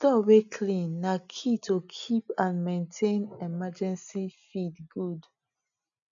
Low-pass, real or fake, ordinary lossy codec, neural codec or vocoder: 7.2 kHz; real; none; none